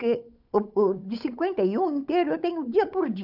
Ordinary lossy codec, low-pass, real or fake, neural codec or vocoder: none; 5.4 kHz; fake; codec, 16 kHz, 8 kbps, FunCodec, trained on Chinese and English, 25 frames a second